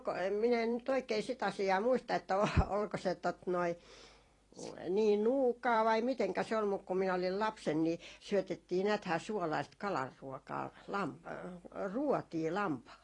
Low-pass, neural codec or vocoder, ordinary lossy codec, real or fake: 10.8 kHz; vocoder, 44.1 kHz, 128 mel bands every 256 samples, BigVGAN v2; AAC, 32 kbps; fake